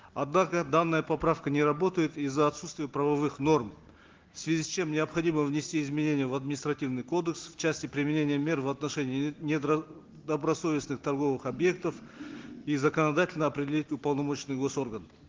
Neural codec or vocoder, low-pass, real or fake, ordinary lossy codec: none; 7.2 kHz; real; Opus, 16 kbps